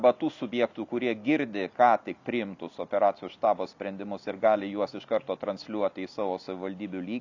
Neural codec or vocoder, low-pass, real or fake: none; 7.2 kHz; real